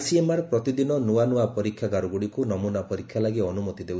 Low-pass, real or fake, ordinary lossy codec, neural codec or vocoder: none; real; none; none